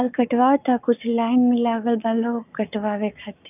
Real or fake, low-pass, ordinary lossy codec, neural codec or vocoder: fake; 3.6 kHz; none; codec, 44.1 kHz, 7.8 kbps, Pupu-Codec